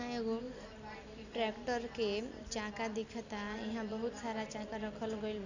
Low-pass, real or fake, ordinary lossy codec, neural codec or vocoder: 7.2 kHz; real; none; none